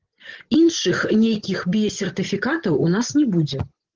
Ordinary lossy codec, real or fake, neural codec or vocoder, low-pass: Opus, 16 kbps; fake; vocoder, 24 kHz, 100 mel bands, Vocos; 7.2 kHz